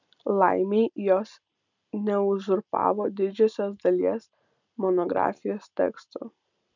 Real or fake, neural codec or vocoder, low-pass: real; none; 7.2 kHz